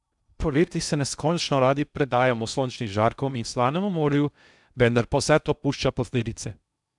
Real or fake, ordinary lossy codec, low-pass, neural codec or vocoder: fake; none; 10.8 kHz; codec, 16 kHz in and 24 kHz out, 0.6 kbps, FocalCodec, streaming, 2048 codes